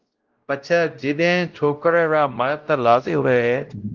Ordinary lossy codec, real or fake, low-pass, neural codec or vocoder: Opus, 24 kbps; fake; 7.2 kHz; codec, 16 kHz, 0.5 kbps, X-Codec, WavLM features, trained on Multilingual LibriSpeech